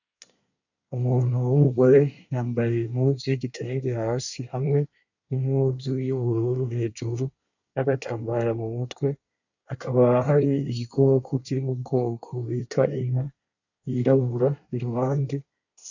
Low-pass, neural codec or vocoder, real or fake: 7.2 kHz; codec, 24 kHz, 1 kbps, SNAC; fake